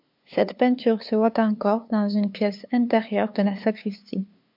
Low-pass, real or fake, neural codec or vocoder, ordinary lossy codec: 5.4 kHz; fake; codec, 16 kHz, 2 kbps, FunCodec, trained on LibriTTS, 25 frames a second; MP3, 48 kbps